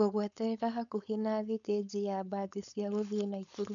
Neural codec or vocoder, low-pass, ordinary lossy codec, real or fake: codec, 16 kHz, 4.8 kbps, FACodec; 7.2 kHz; none; fake